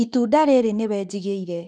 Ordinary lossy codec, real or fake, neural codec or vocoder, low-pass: none; fake; autoencoder, 48 kHz, 32 numbers a frame, DAC-VAE, trained on Japanese speech; 9.9 kHz